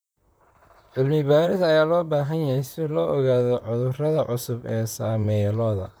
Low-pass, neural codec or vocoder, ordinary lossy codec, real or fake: none; vocoder, 44.1 kHz, 128 mel bands, Pupu-Vocoder; none; fake